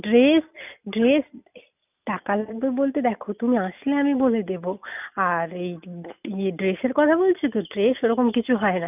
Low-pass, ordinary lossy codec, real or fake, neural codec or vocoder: 3.6 kHz; none; real; none